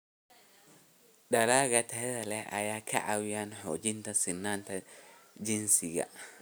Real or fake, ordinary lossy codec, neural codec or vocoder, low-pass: real; none; none; none